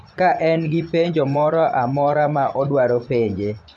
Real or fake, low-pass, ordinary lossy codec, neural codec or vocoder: fake; none; none; vocoder, 24 kHz, 100 mel bands, Vocos